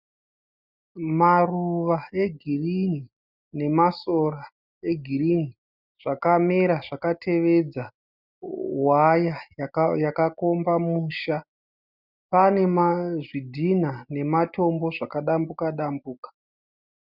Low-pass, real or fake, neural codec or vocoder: 5.4 kHz; real; none